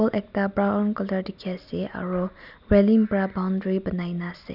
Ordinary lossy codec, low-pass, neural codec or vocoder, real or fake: none; 5.4 kHz; none; real